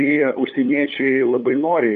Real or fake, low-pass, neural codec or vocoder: fake; 7.2 kHz; codec, 16 kHz, 8 kbps, FunCodec, trained on LibriTTS, 25 frames a second